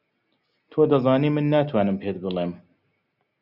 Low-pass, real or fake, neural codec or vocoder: 5.4 kHz; real; none